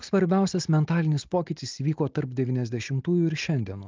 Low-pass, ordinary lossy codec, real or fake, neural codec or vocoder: 7.2 kHz; Opus, 24 kbps; real; none